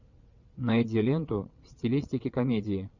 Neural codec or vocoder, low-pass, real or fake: vocoder, 22.05 kHz, 80 mel bands, WaveNeXt; 7.2 kHz; fake